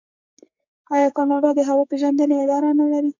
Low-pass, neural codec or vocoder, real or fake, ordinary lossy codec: 7.2 kHz; codec, 44.1 kHz, 2.6 kbps, SNAC; fake; MP3, 64 kbps